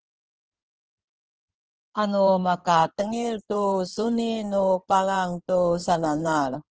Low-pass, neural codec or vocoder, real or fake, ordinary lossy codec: 7.2 kHz; codec, 16 kHz in and 24 kHz out, 2.2 kbps, FireRedTTS-2 codec; fake; Opus, 16 kbps